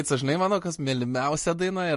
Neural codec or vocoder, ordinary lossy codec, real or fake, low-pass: none; MP3, 48 kbps; real; 14.4 kHz